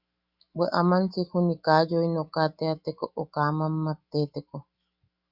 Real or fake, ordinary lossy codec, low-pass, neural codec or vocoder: fake; Opus, 64 kbps; 5.4 kHz; autoencoder, 48 kHz, 128 numbers a frame, DAC-VAE, trained on Japanese speech